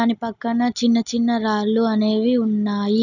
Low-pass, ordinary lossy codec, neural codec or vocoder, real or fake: 7.2 kHz; none; none; real